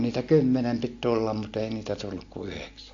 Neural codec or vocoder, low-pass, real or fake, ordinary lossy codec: none; 7.2 kHz; real; AAC, 48 kbps